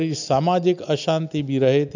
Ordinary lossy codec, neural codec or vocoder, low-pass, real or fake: none; none; 7.2 kHz; real